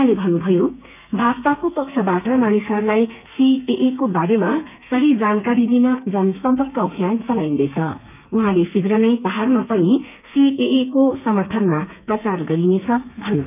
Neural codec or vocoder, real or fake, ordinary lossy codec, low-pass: codec, 32 kHz, 1.9 kbps, SNAC; fake; none; 3.6 kHz